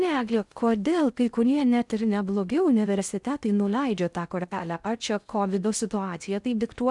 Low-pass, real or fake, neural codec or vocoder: 10.8 kHz; fake; codec, 16 kHz in and 24 kHz out, 0.6 kbps, FocalCodec, streaming, 2048 codes